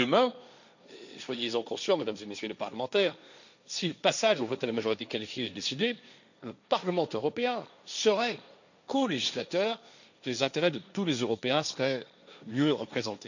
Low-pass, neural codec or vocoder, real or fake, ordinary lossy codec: 7.2 kHz; codec, 16 kHz, 1.1 kbps, Voila-Tokenizer; fake; none